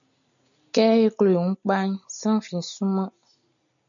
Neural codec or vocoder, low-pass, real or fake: none; 7.2 kHz; real